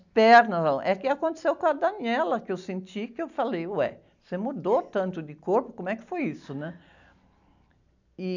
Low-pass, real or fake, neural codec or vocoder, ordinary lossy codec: 7.2 kHz; real; none; none